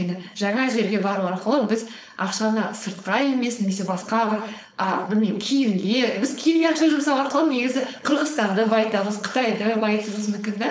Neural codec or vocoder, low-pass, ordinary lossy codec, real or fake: codec, 16 kHz, 4.8 kbps, FACodec; none; none; fake